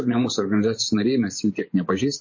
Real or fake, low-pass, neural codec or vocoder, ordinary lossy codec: fake; 7.2 kHz; vocoder, 24 kHz, 100 mel bands, Vocos; MP3, 32 kbps